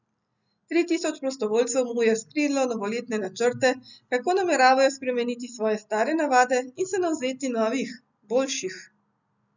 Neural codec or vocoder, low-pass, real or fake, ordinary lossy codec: none; 7.2 kHz; real; none